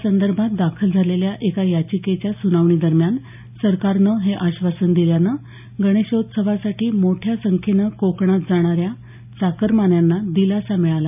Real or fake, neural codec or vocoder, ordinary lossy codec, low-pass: real; none; none; 3.6 kHz